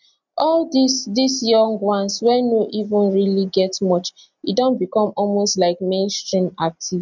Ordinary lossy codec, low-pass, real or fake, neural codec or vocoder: none; 7.2 kHz; real; none